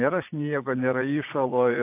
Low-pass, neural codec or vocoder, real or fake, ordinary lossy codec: 3.6 kHz; vocoder, 22.05 kHz, 80 mel bands, Vocos; fake; AAC, 24 kbps